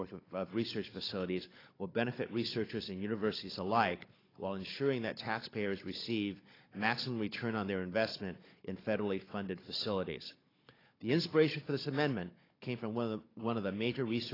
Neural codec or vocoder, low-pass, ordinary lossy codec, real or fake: codec, 16 kHz, 16 kbps, FunCodec, trained on Chinese and English, 50 frames a second; 5.4 kHz; AAC, 24 kbps; fake